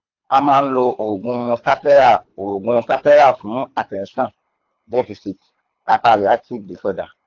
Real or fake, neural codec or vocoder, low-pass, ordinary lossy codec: fake; codec, 24 kHz, 3 kbps, HILCodec; 7.2 kHz; AAC, 48 kbps